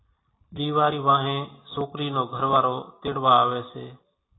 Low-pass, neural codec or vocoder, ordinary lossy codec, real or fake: 7.2 kHz; none; AAC, 16 kbps; real